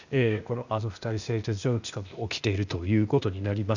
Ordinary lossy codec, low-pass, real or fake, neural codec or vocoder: none; 7.2 kHz; fake; codec, 16 kHz, 0.8 kbps, ZipCodec